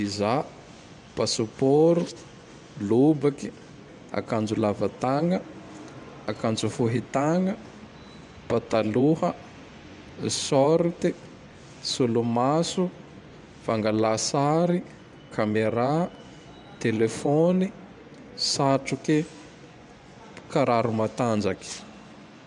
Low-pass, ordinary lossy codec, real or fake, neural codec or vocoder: 10.8 kHz; none; fake; vocoder, 24 kHz, 100 mel bands, Vocos